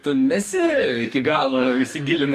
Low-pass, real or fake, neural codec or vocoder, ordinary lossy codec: 14.4 kHz; fake; codec, 44.1 kHz, 2.6 kbps, DAC; AAC, 96 kbps